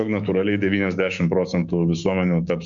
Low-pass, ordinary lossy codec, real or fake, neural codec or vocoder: 7.2 kHz; MP3, 64 kbps; fake; codec, 16 kHz, 6 kbps, DAC